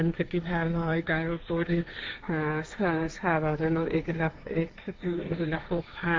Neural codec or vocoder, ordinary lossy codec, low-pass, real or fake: codec, 16 kHz, 1.1 kbps, Voila-Tokenizer; none; none; fake